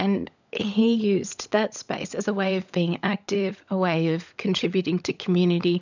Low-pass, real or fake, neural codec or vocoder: 7.2 kHz; fake; codec, 16 kHz, 16 kbps, FunCodec, trained on LibriTTS, 50 frames a second